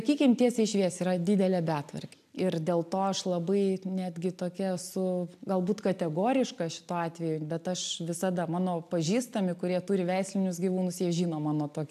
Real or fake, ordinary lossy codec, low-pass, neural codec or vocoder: real; AAC, 96 kbps; 14.4 kHz; none